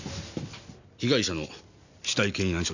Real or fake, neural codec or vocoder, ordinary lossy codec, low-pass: real; none; none; 7.2 kHz